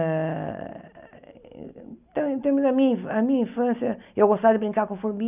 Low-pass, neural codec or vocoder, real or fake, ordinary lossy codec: 3.6 kHz; none; real; none